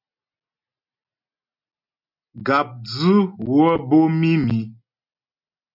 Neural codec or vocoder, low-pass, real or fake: none; 5.4 kHz; real